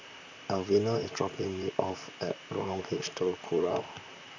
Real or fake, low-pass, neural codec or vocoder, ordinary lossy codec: fake; 7.2 kHz; vocoder, 44.1 kHz, 128 mel bands, Pupu-Vocoder; none